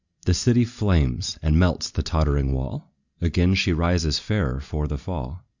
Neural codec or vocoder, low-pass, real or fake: none; 7.2 kHz; real